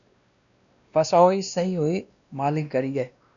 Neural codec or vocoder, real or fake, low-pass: codec, 16 kHz, 1 kbps, X-Codec, WavLM features, trained on Multilingual LibriSpeech; fake; 7.2 kHz